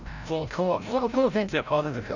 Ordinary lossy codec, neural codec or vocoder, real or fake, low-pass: none; codec, 16 kHz, 0.5 kbps, FreqCodec, larger model; fake; 7.2 kHz